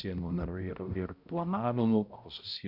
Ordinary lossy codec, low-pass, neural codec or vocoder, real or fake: Opus, 64 kbps; 5.4 kHz; codec, 16 kHz, 0.5 kbps, X-Codec, HuBERT features, trained on balanced general audio; fake